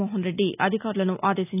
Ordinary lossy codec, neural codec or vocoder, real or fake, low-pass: none; none; real; 3.6 kHz